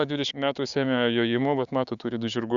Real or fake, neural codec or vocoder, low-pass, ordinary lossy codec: fake; codec, 16 kHz, 6 kbps, DAC; 7.2 kHz; Opus, 64 kbps